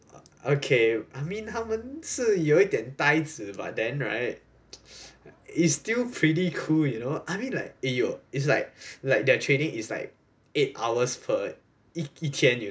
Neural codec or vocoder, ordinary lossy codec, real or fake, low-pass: none; none; real; none